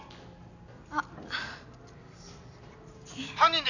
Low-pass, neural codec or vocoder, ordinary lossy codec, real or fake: 7.2 kHz; none; none; real